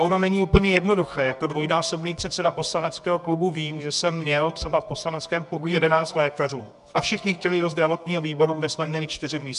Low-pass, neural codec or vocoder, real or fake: 10.8 kHz; codec, 24 kHz, 0.9 kbps, WavTokenizer, medium music audio release; fake